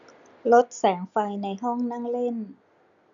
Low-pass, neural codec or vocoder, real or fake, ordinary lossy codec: 7.2 kHz; none; real; none